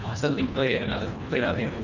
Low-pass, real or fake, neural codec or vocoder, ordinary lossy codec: 7.2 kHz; fake; codec, 24 kHz, 1.5 kbps, HILCodec; none